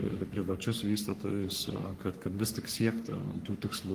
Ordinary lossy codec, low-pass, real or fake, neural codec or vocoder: Opus, 24 kbps; 14.4 kHz; fake; codec, 44.1 kHz, 3.4 kbps, Pupu-Codec